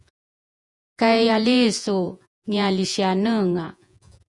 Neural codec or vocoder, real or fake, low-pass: vocoder, 48 kHz, 128 mel bands, Vocos; fake; 10.8 kHz